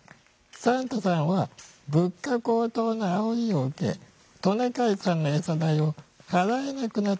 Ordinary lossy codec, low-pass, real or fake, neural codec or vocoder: none; none; real; none